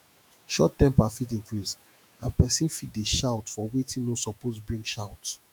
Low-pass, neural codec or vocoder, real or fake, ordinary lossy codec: none; autoencoder, 48 kHz, 128 numbers a frame, DAC-VAE, trained on Japanese speech; fake; none